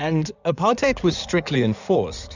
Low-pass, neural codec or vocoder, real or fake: 7.2 kHz; codec, 16 kHz in and 24 kHz out, 2.2 kbps, FireRedTTS-2 codec; fake